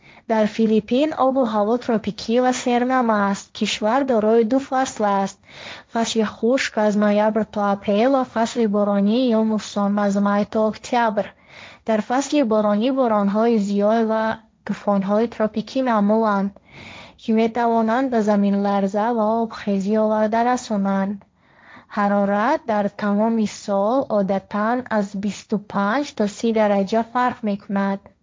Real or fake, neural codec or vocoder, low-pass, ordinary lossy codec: fake; codec, 16 kHz, 1.1 kbps, Voila-Tokenizer; none; none